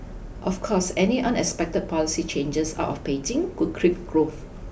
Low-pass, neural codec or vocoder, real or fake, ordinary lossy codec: none; none; real; none